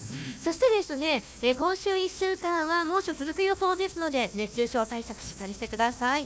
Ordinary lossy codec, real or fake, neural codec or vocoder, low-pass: none; fake; codec, 16 kHz, 1 kbps, FunCodec, trained on Chinese and English, 50 frames a second; none